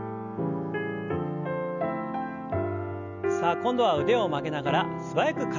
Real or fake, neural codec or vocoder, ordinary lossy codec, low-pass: real; none; none; 7.2 kHz